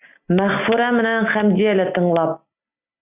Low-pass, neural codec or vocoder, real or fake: 3.6 kHz; none; real